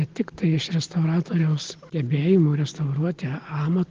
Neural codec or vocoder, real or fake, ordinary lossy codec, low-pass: none; real; Opus, 16 kbps; 7.2 kHz